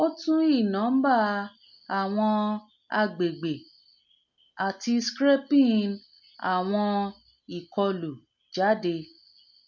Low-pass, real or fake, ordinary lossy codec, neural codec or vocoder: 7.2 kHz; real; MP3, 64 kbps; none